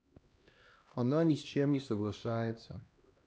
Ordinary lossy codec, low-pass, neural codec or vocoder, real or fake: none; none; codec, 16 kHz, 1 kbps, X-Codec, HuBERT features, trained on LibriSpeech; fake